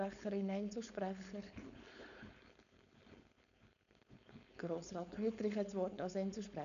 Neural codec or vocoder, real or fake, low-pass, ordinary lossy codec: codec, 16 kHz, 4.8 kbps, FACodec; fake; 7.2 kHz; none